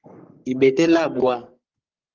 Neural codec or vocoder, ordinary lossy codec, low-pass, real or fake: codec, 44.1 kHz, 3.4 kbps, Pupu-Codec; Opus, 32 kbps; 7.2 kHz; fake